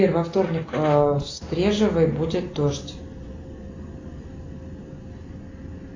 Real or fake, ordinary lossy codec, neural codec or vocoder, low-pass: real; AAC, 32 kbps; none; 7.2 kHz